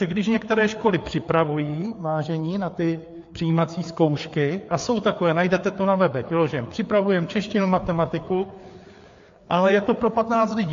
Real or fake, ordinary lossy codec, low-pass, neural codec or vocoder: fake; AAC, 48 kbps; 7.2 kHz; codec, 16 kHz, 4 kbps, FreqCodec, larger model